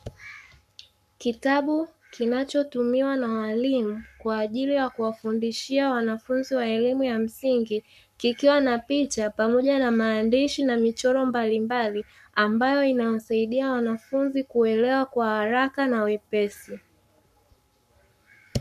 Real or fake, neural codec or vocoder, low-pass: fake; codec, 44.1 kHz, 7.8 kbps, Pupu-Codec; 14.4 kHz